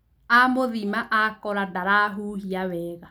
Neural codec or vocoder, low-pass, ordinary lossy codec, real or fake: none; none; none; real